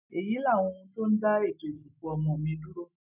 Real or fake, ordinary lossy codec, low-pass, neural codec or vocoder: real; none; 3.6 kHz; none